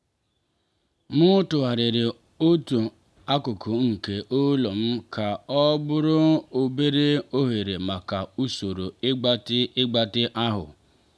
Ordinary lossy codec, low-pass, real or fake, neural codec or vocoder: none; none; real; none